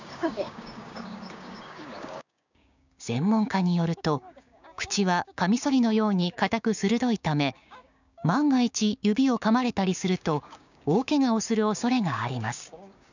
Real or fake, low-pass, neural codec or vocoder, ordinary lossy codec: fake; 7.2 kHz; codec, 16 kHz, 6 kbps, DAC; none